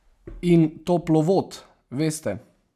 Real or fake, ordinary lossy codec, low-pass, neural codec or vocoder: real; none; 14.4 kHz; none